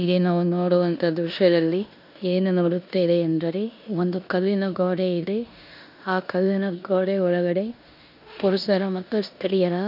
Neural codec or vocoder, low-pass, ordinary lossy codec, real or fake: codec, 16 kHz in and 24 kHz out, 0.9 kbps, LongCat-Audio-Codec, four codebook decoder; 5.4 kHz; none; fake